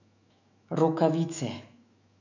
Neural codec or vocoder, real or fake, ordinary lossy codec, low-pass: autoencoder, 48 kHz, 128 numbers a frame, DAC-VAE, trained on Japanese speech; fake; none; 7.2 kHz